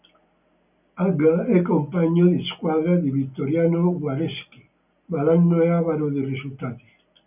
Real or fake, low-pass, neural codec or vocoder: real; 3.6 kHz; none